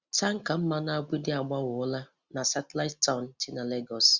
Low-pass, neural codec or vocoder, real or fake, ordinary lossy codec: 7.2 kHz; none; real; Opus, 64 kbps